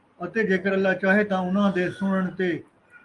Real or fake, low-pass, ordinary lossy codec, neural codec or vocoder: real; 10.8 kHz; Opus, 32 kbps; none